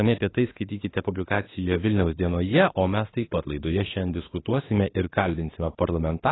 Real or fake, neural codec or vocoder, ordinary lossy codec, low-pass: fake; autoencoder, 48 kHz, 32 numbers a frame, DAC-VAE, trained on Japanese speech; AAC, 16 kbps; 7.2 kHz